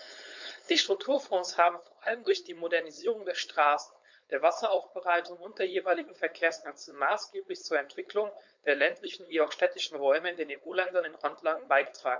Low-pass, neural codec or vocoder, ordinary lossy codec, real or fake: 7.2 kHz; codec, 16 kHz, 4.8 kbps, FACodec; MP3, 64 kbps; fake